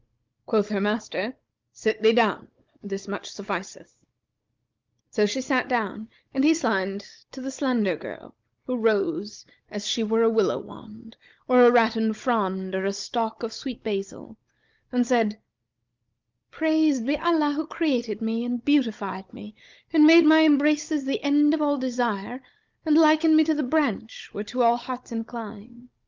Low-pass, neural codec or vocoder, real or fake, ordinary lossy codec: 7.2 kHz; codec, 16 kHz, 16 kbps, FunCodec, trained on Chinese and English, 50 frames a second; fake; Opus, 24 kbps